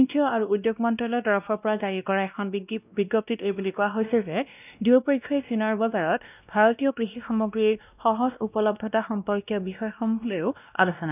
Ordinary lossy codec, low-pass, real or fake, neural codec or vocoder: none; 3.6 kHz; fake; codec, 16 kHz, 1 kbps, X-Codec, WavLM features, trained on Multilingual LibriSpeech